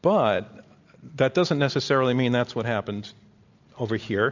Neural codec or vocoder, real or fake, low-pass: none; real; 7.2 kHz